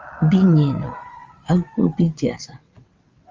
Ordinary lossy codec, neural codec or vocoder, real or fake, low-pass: Opus, 32 kbps; vocoder, 22.05 kHz, 80 mel bands, WaveNeXt; fake; 7.2 kHz